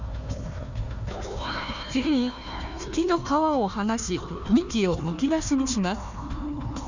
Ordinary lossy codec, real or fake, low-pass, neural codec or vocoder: none; fake; 7.2 kHz; codec, 16 kHz, 1 kbps, FunCodec, trained on Chinese and English, 50 frames a second